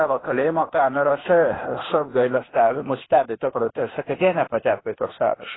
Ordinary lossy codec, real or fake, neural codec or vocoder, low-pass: AAC, 16 kbps; fake; codec, 16 kHz, 0.8 kbps, ZipCodec; 7.2 kHz